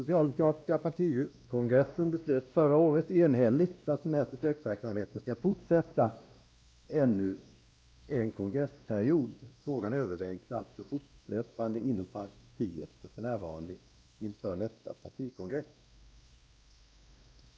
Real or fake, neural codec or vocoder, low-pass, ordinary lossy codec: fake; codec, 16 kHz, 1 kbps, X-Codec, WavLM features, trained on Multilingual LibriSpeech; none; none